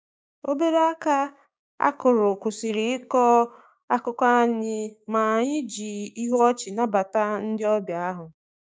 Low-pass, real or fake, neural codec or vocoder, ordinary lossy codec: none; fake; codec, 16 kHz, 6 kbps, DAC; none